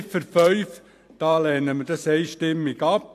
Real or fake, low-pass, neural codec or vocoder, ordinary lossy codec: fake; 14.4 kHz; vocoder, 44.1 kHz, 128 mel bands every 512 samples, BigVGAN v2; AAC, 64 kbps